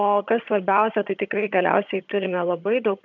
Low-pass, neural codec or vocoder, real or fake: 7.2 kHz; vocoder, 22.05 kHz, 80 mel bands, HiFi-GAN; fake